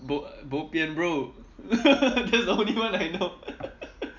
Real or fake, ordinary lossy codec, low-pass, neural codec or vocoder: real; none; 7.2 kHz; none